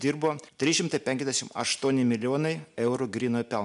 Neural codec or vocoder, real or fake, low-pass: none; real; 10.8 kHz